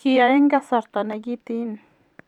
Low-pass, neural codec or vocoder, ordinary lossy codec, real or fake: 19.8 kHz; vocoder, 44.1 kHz, 128 mel bands every 512 samples, BigVGAN v2; none; fake